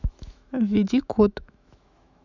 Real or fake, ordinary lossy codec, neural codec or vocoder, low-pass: fake; none; autoencoder, 48 kHz, 128 numbers a frame, DAC-VAE, trained on Japanese speech; 7.2 kHz